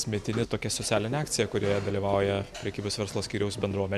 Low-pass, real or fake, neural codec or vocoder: 14.4 kHz; real; none